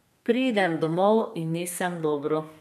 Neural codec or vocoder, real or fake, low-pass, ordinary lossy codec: codec, 32 kHz, 1.9 kbps, SNAC; fake; 14.4 kHz; none